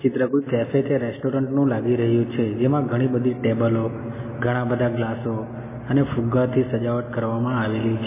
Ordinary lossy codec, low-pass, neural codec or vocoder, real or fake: MP3, 16 kbps; 3.6 kHz; none; real